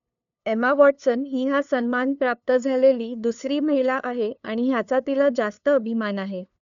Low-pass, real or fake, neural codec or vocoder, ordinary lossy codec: 7.2 kHz; fake; codec, 16 kHz, 2 kbps, FunCodec, trained on LibriTTS, 25 frames a second; none